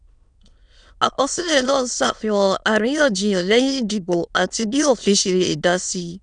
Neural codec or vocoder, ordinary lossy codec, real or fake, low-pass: autoencoder, 22.05 kHz, a latent of 192 numbers a frame, VITS, trained on many speakers; none; fake; 9.9 kHz